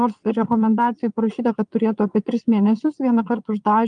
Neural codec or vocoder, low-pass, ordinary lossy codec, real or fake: vocoder, 22.05 kHz, 80 mel bands, Vocos; 9.9 kHz; Opus, 32 kbps; fake